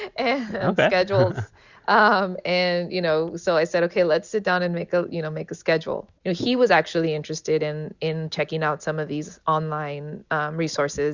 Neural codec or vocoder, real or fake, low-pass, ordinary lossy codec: none; real; 7.2 kHz; Opus, 64 kbps